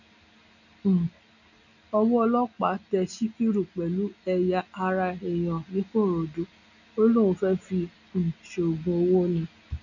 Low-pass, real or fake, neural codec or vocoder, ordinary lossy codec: 7.2 kHz; real; none; none